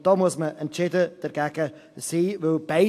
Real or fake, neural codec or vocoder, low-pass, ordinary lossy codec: real; none; 14.4 kHz; AAC, 64 kbps